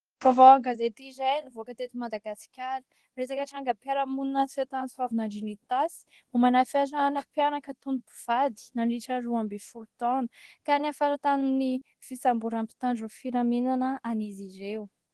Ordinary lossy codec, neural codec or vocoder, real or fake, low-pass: Opus, 16 kbps; codec, 24 kHz, 0.9 kbps, DualCodec; fake; 10.8 kHz